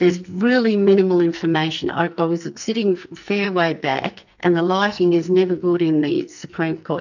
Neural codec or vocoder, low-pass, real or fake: codec, 44.1 kHz, 2.6 kbps, SNAC; 7.2 kHz; fake